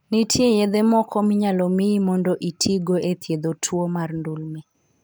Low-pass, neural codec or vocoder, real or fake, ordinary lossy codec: none; none; real; none